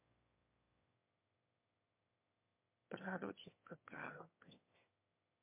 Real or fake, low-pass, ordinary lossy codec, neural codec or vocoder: fake; 3.6 kHz; MP3, 24 kbps; autoencoder, 22.05 kHz, a latent of 192 numbers a frame, VITS, trained on one speaker